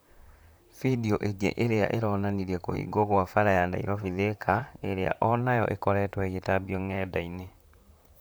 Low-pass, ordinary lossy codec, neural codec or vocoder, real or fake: none; none; vocoder, 44.1 kHz, 128 mel bands, Pupu-Vocoder; fake